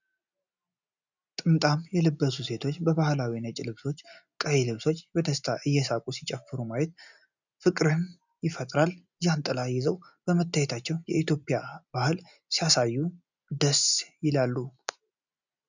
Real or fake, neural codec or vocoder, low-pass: real; none; 7.2 kHz